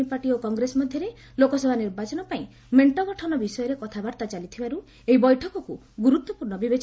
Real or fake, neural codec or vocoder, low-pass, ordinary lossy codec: real; none; none; none